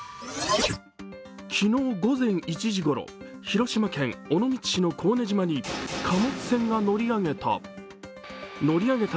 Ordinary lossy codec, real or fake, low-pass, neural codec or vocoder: none; real; none; none